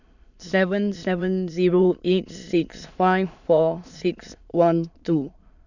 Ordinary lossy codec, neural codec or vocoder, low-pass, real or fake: none; autoencoder, 22.05 kHz, a latent of 192 numbers a frame, VITS, trained on many speakers; 7.2 kHz; fake